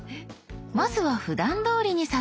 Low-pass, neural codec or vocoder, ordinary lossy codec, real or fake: none; none; none; real